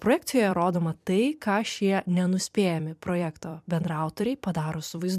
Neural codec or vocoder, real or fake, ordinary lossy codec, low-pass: vocoder, 44.1 kHz, 128 mel bands every 256 samples, BigVGAN v2; fake; MP3, 96 kbps; 14.4 kHz